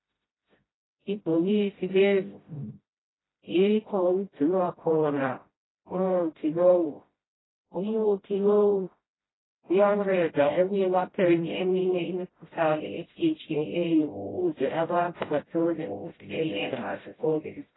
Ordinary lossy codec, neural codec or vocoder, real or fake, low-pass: AAC, 16 kbps; codec, 16 kHz, 0.5 kbps, FreqCodec, smaller model; fake; 7.2 kHz